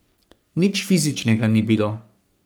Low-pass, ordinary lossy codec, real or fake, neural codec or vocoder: none; none; fake; codec, 44.1 kHz, 3.4 kbps, Pupu-Codec